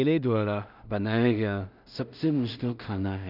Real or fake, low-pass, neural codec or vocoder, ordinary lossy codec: fake; 5.4 kHz; codec, 16 kHz in and 24 kHz out, 0.4 kbps, LongCat-Audio-Codec, two codebook decoder; none